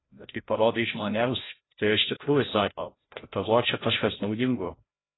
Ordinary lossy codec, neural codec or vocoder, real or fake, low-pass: AAC, 16 kbps; codec, 16 kHz, 0.5 kbps, FreqCodec, larger model; fake; 7.2 kHz